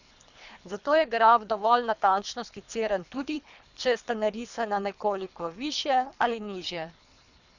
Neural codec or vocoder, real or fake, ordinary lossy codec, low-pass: codec, 24 kHz, 3 kbps, HILCodec; fake; none; 7.2 kHz